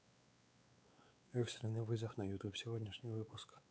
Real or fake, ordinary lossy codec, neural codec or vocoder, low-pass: fake; none; codec, 16 kHz, 4 kbps, X-Codec, WavLM features, trained on Multilingual LibriSpeech; none